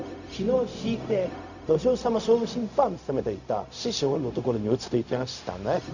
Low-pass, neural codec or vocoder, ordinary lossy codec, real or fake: 7.2 kHz; codec, 16 kHz, 0.4 kbps, LongCat-Audio-Codec; none; fake